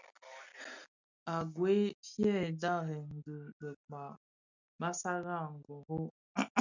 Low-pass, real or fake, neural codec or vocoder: 7.2 kHz; real; none